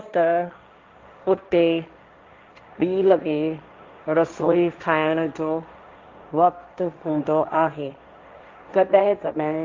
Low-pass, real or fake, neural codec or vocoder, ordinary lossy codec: 7.2 kHz; fake; codec, 16 kHz, 1.1 kbps, Voila-Tokenizer; Opus, 24 kbps